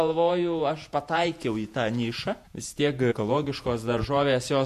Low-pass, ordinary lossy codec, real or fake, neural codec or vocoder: 14.4 kHz; MP3, 64 kbps; fake; vocoder, 48 kHz, 128 mel bands, Vocos